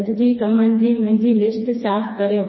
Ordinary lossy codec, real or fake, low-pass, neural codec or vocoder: MP3, 24 kbps; fake; 7.2 kHz; codec, 16 kHz, 2 kbps, FreqCodec, smaller model